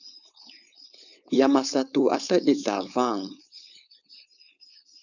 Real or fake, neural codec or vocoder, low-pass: fake; codec, 16 kHz, 4.8 kbps, FACodec; 7.2 kHz